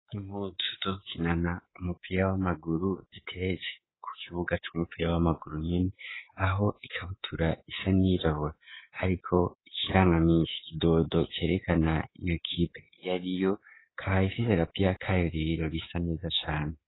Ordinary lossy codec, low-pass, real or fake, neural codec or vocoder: AAC, 16 kbps; 7.2 kHz; fake; codec, 24 kHz, 3.1 kbps, DualCodec